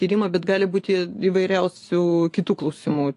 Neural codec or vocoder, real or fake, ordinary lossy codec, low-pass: vocoder, 24 kHz, 100 mel bands, Vocos; fake; AAC, 48 kbps; 10.8 kHz